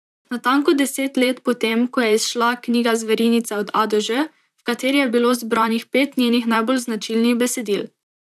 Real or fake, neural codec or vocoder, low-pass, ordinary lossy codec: fake; vocoder, 44.1 kHz, 128 mel bands, Pupu-Vocoder; 14.4 kHz; none